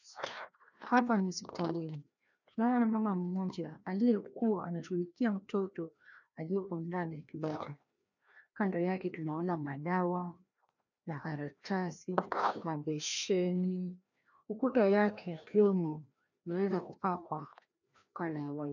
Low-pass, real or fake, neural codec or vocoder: 7.2 kHz; fake; codec, 16 kHz, 1 kbps, FreqCodec, larger model